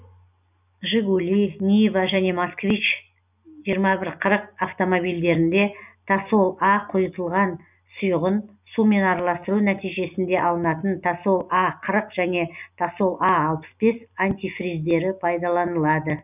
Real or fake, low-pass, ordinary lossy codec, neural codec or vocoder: real; 3.6 kHz; none; none